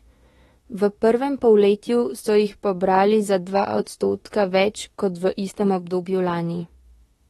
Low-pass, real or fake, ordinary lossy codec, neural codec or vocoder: 19.8 kHz; fake; AAC, 32 kbps; autoencoder, 48 kHz, 32 numbers a frame, DAC-VAE, trained on Japanese speech